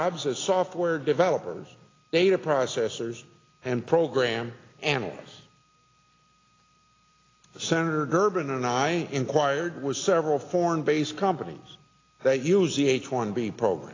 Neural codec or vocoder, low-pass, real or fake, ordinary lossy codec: none; 7.2 kHz; real; AAC, 32 kbps